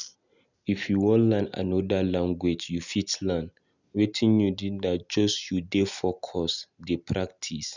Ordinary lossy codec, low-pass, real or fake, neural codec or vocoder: none; 7.2 kHz; real; none